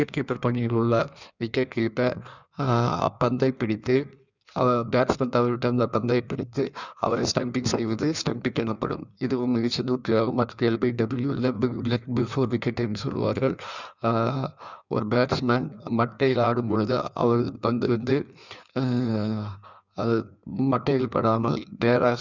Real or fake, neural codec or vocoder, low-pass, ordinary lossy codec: fake; codec, 16 kHz in and 24 kHz out, 1.1 kbps, FireRedTTS-2 codec; 7.2 kHz; none